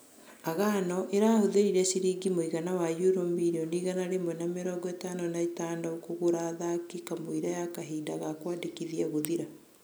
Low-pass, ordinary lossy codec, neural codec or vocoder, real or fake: none; none; none; real